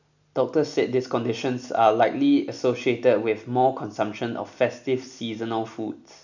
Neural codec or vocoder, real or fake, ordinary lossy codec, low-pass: none; real; none; 7.2 kHz